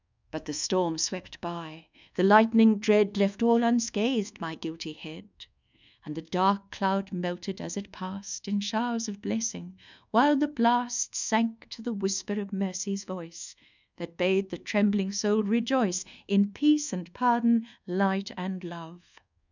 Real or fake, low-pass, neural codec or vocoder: fake; 7.2 kHz; codec, 24 kHz, 1.2 kbps, DualCodec